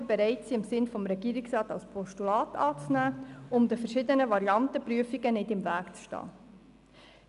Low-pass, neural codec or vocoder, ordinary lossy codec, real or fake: 10.8 kHz; none; none; real